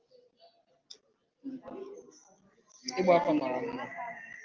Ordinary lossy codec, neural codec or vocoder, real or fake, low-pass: Opus, 32 kbps; none; real; 7.2 kHz